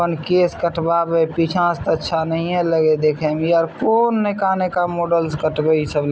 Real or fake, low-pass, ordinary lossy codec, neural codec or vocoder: real; none; none; none